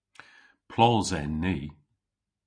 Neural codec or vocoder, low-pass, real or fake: none; 9.9 kHz; real